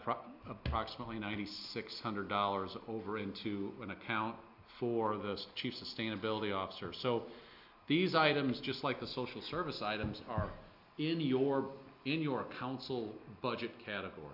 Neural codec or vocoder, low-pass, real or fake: none; 5.4 kHz; real